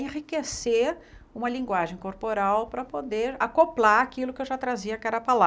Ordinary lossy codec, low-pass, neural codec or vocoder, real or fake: none; none; none; real